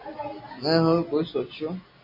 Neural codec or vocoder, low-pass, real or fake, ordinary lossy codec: none; 5.4 kHz; real; MP3, 24 kbps